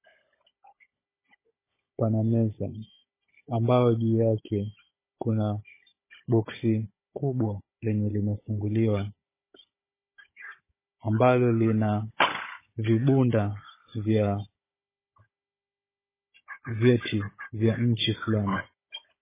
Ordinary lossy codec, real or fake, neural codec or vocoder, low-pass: MP3, 16 kbps; fake; codec, 16 kHz, 16 kbps, FunCodec, trained on Chinese and English, 50 frames a second; 3.6 kHz